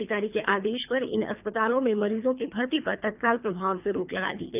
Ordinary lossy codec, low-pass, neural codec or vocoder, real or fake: none; 3.6 kHz; codec, 24 kHz, 3 kbps, HILCodec; fake